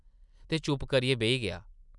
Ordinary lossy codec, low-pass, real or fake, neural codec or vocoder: none; 10.8 kHz; real; none